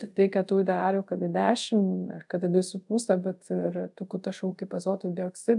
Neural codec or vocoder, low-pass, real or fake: codec, 24 kHz, 0.5 kbps, DualCodec; 10.8 kHz; fake